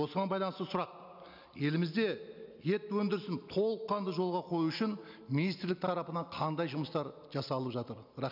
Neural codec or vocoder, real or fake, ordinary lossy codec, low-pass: none; real; none; 5.4 kHz